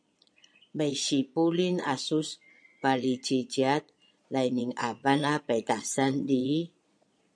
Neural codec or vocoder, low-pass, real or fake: vocoder, 22.05 kHz, 80 mel bands, Vocos; 9.9 kHz; fake